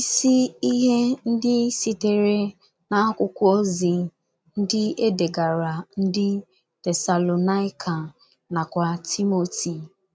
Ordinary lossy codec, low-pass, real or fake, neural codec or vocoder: none; none; real; none